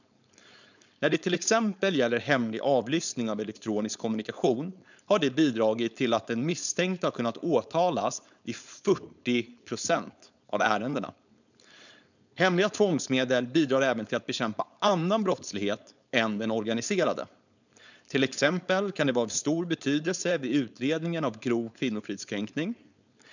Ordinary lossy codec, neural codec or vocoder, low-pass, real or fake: none; codec, 16 kHz, 4.8 kbps, FACodec; 7.2 kHz; fake